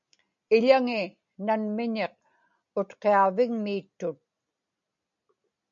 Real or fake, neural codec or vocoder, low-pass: real; none; 7.2 kHz